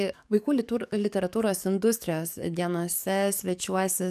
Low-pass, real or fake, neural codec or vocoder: 14.4 kHz; fake; codec, 44.1 kHz, 7.8 kbps, DAC